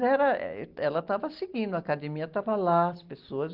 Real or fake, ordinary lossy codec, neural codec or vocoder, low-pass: real; Opus, 16 kbps; none; 5.4 kHz